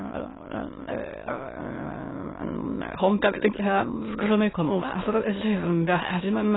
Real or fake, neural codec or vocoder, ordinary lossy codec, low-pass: fake; autoencoder, 22.05 kHz, a latent of 192 numbers a frame, VITS, trained on many speakers; AAC, 16 kbps; 7.2 kHz